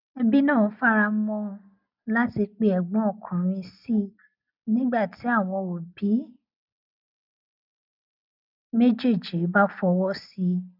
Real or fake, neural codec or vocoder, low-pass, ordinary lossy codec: real; none; 5.4 kHz; none